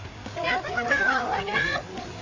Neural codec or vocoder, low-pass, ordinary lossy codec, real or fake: codec, 16 kHz, 4 kbps, FreqCodec, larger model; 7.2 kHz; AAC, 32 kbps; fake